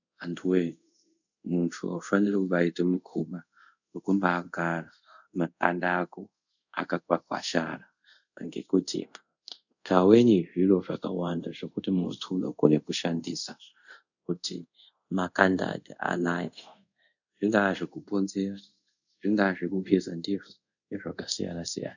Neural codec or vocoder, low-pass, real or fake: codec, 24 kHz, 0.5 kbps, DualCodec; 7.2 kHz; fake